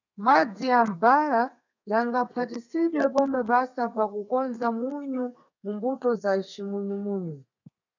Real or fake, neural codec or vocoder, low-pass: fake; codec, 32 kHz, 1.9 kbps, SNAC; 7.2 kHz